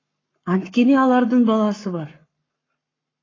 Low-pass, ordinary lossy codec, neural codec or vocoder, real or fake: 7.2 kHz; AAC, 32 kbps; codec, 44.1 kHz, 7.8 kbps, Pupu-Codec; fake